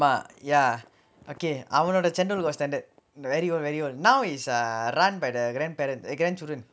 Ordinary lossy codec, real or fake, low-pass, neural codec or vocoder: none; real; none; none